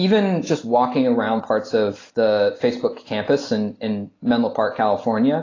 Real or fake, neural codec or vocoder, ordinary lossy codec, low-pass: real; none; AAC, 32 kbps; 7.2 kHz